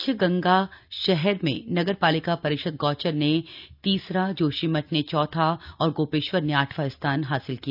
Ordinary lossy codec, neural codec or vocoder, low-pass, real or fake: none; none; 5.4 kHz; real